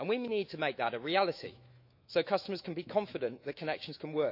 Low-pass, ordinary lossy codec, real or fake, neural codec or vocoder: 5.4 kHz; none; fake; autoencoder, 48 kHz, 128 numbers a frame, DAC-VAE, trained on Japanese speech